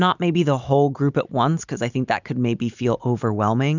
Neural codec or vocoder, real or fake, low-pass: none; real; 7.2 kHz